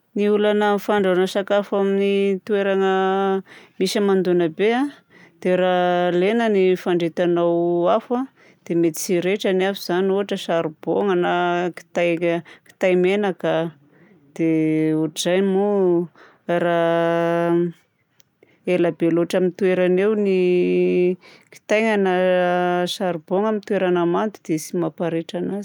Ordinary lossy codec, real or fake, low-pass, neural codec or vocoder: none; real; 19.8 kHz; none